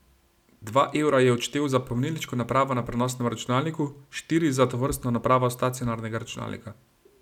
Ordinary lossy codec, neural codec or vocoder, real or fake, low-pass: none; none; real; 19.8 kHz